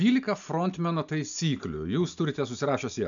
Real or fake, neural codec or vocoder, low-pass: real; none; 7.2 kHz